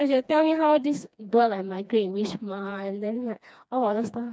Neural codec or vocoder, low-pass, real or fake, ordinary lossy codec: codec, 16 kHz, 2 kbps, FreqCodec, smaller model; none; fake; none